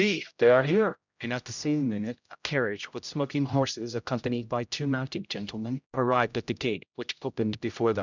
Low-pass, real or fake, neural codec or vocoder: 7.2 kHz; fake; codec, 16 kHz, 0.5 kbps, X-Codec, HuBERT features, trained on general audio